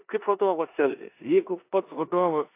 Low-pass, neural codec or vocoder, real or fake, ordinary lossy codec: 3.6 kHz; codec, 16 kHz in and 24 kHz out, 0.9 kbps, LongCat-Audio-Codec, four codebook decoder; fake; AAC, 24 kbps